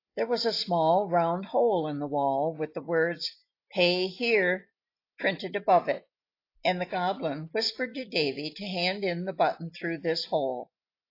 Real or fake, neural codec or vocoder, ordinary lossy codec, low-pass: real; none; AAC, 32 kbps; 5.4 kHz